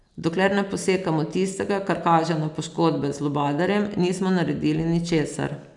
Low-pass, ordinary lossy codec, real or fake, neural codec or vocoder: 10.8 kHz; none; real; none